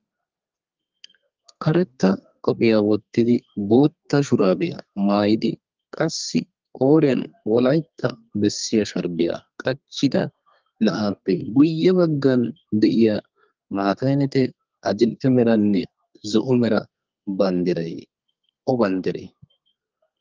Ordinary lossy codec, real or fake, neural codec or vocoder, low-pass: Opus, 32 kbps; fake; codec, 32 kHz, 1.9 kbps, SNAC; 7.2 kHz